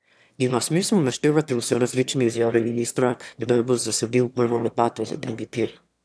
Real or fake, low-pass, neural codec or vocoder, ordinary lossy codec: fake; none; autoencoder, 22.05 kHz, a latent of 192 numbers a frame, VITS, trained on one speaker; none